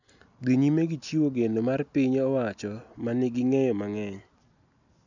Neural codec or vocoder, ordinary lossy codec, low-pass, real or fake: none; none; 7.2 kHz; real